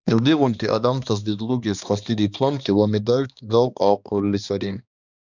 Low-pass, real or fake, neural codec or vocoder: 7.2 kHz; fake; codec, 16 kHz, 2 kbps, X-Codec, HuBERT features, trained on balanced general audio